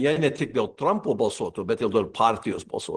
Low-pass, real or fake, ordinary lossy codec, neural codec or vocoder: 9.9 kHz; real; Opus, 16 kbps; none